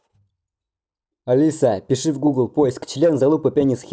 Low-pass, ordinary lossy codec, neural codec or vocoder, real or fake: none; none; none; real